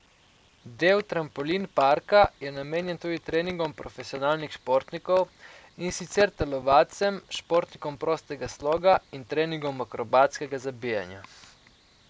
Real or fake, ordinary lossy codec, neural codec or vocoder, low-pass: real; none; none; none